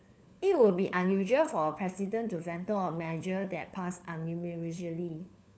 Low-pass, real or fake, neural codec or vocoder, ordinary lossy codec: none; fake; codec, 16 kHz, 4 kbps, FunCodec, trained on LibriTTS, 50 frames a second; none